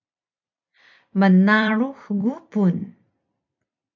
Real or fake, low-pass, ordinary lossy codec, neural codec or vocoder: fake; 7.2 kHz; MP3, 64 kbps; vocoder, 44.1 kHz, 128 mel bands every 256 samples, BigVGAN v2